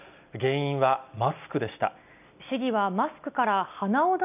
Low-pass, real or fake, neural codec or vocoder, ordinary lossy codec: 3.6 kHz; real; none; none